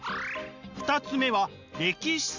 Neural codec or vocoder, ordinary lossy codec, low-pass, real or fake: none; Opus, 64 kbps; 7.2 kHz; real